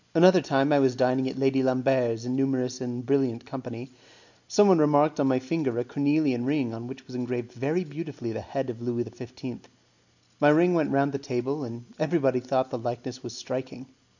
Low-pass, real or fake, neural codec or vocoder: 7.2 kHz; real; none